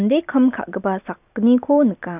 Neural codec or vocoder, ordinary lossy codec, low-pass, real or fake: none; none; 3.6 kHz; real